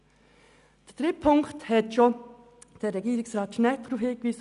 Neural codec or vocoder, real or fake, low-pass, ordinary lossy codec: none; real; 10.8 kHz; none